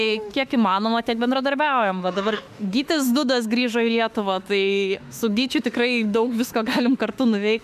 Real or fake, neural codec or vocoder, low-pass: fake; autoencoder, 48 kHz, 32 numbers a frame, DAC-VAE, trained on Japanese speech; 14.4 kHz